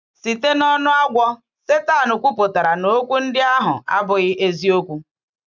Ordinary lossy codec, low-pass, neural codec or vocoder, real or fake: none; 7.2 kHz; none; real